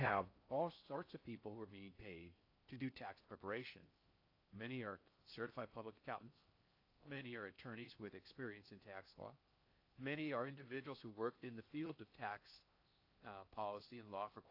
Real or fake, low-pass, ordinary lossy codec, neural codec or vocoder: fake; 5.4 kHz; AAC, 32 kbps; codec, 16 kHz in and 24 kHz out, 0.6 kbps, FocalCodec, streaming, 4096 codes